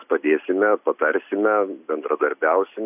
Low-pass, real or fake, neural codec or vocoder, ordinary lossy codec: 3.6 kHz; real; none; AAC, 32 kbps